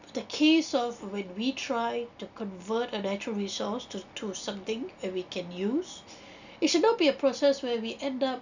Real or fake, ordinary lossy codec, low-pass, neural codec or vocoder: real; none; 7.2 kHz; none